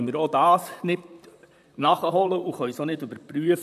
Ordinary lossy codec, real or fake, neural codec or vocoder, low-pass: AAC, 96 kbps; fake; vocoder, 44.1 kHz, 128 mel bands, Pupu-Vocoder; 14.4 kHz